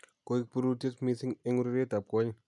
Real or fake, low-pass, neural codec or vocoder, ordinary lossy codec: real; 10.8 kHz; none; none